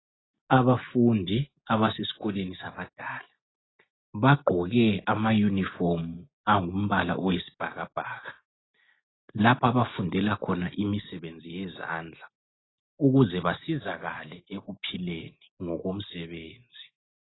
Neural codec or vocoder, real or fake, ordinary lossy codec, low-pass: none; real; AAC, 16 kbps; 7.2 kHz